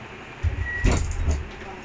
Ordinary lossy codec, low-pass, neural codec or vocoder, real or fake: none; none; none; real